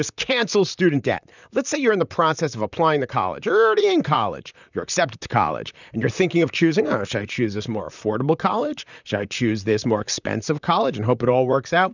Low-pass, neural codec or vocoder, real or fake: 7.2 kHz; vocoder, 44.1 kHz, 128 mel bands, Pupu-Vocoder; fake